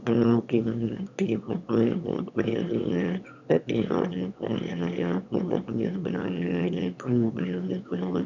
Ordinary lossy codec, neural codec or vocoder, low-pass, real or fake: none; autoencoder, 22.05 kHz, a latent of 192 numbers a frame, VITS, trained on one speaker; 7.2 kHz; fake